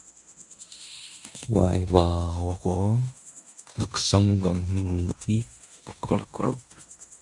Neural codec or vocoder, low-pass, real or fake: codec, 16 kHz in and 24 kHz out, 0.9 kbps, LongCat-Audio-Codec, fine tuned four codebook decoder; 10.8 kHz; fake